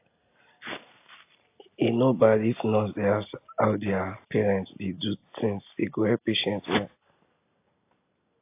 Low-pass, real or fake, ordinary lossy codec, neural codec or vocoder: 3.6 kHz; real; AAC, 24 kbps; none